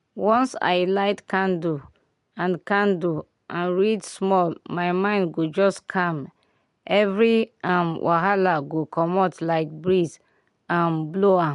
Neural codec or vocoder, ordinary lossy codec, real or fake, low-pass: none; MP3, 64 kbps; real; 10.8 kHz